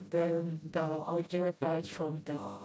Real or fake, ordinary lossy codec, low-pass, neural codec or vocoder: fake; none; none; codec, 16 kHz, 0.5 kbps, FreqCodec, smaller model